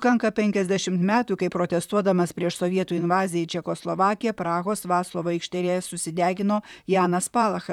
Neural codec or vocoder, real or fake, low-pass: vocoder, 44.1 kHz, 128 mel bands, Pupu-Vocoder; fake; 19.8 kHz